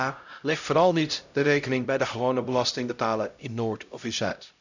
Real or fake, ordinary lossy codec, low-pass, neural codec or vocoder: fake; none; 7.2 kHz; codec, 16 kHz, 0.5 kbps, X-Codec, HuBERT features, trained on LibriSpeech